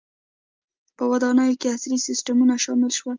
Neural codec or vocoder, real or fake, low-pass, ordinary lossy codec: none; real; 7.2 kHz; Opus, 24 kbps